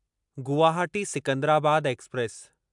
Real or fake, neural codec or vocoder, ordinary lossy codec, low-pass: real; none; MP3, 96 kbps; 10.8 kHz